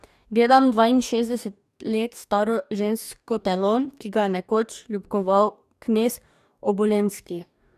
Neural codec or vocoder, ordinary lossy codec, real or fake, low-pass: codec, 44.1 kHz, 2.6 kbps, DAC; none; fake; 14.4 kHz